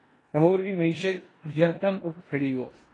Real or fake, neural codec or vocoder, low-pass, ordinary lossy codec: fake; codec, 16 kHz in and 24 kHz out, 0.9 kbps, LongCat-Audio-Codec, four codebook decoder; 10.8 kHz; AAC, 32 kbps